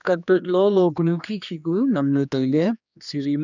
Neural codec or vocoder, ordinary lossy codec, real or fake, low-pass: codec, 16 kHz, 2 kbps, X-Codec, HuBERT features, trained on general audio; none; fake; 7.2 kHz